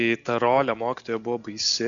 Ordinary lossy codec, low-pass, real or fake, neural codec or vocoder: MP3, 96 kbps; 7.2 kHz; real; none